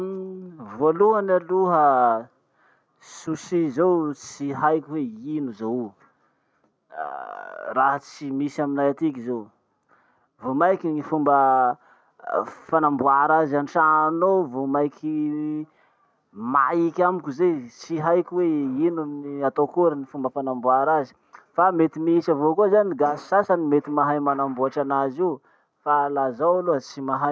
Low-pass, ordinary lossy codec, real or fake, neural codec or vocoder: none; none; real; none